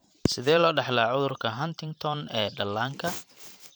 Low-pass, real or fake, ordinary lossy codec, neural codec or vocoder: none; fake; none; vocoder, 44.1 kHz, 128 mel bands every 512 samples, BigVGAN v2